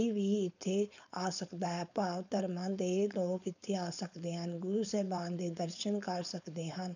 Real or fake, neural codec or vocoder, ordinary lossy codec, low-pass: fake; codec, 16 kHz, 4.8 kbps, FACodec; none; 7.2 kHz